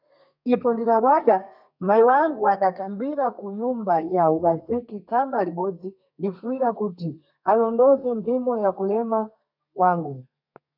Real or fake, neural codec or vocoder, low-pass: fake; codec, 32 kHz, 1.9 kbps, SNAC; 5.4 kHz